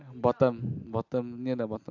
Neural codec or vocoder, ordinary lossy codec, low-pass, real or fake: none; AAC, 48 kbps; 7.2 kHz; real